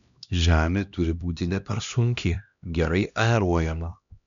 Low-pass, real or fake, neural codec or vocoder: 7.2 kHz; fake; codec, 16 kHz, 1 kbps, X-Codec, HuBERT features, trained on LibriSpeech